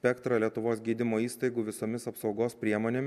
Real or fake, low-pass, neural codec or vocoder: real; 14.4 kHz; none